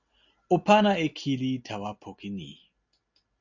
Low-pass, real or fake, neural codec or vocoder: 7.2 kHz; real; none